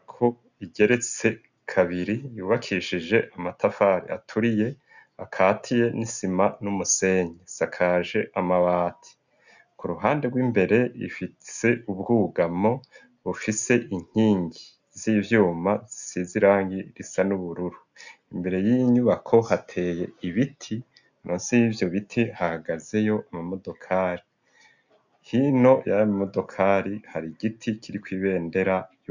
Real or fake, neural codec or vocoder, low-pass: real; none; 7.2 kHz